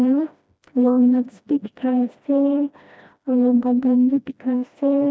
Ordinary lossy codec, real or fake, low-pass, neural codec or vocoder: none; fake; none; codec, 16 kHz, 1 kbps, FreqCodec, smaller model